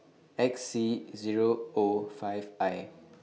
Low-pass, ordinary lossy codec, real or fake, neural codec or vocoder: none; none; real; none